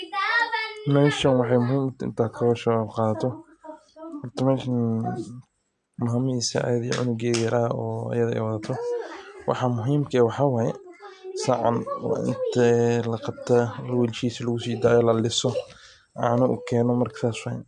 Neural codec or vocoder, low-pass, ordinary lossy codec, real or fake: none; 9.9 kHz; MP3, 64 kbps; real